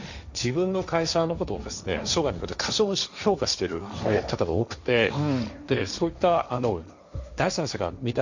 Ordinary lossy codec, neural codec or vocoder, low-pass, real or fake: none; codec, 16 kHz, 1.1 kbps, Voila-Tokenizer; 7.2 kHz; fake